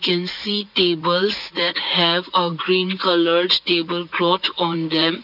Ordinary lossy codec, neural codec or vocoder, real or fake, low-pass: none; vocoder, 44.1 kHz, 80 mel bands, Vocos; fake; 5.4 kHz